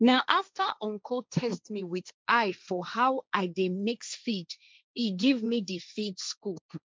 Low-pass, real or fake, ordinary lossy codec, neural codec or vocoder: none; fake; none; codec, 16 kHz, 1.1 kbps, Voila-Tokenizer